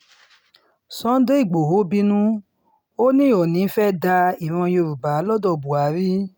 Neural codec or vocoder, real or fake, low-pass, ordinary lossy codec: none; real; none; none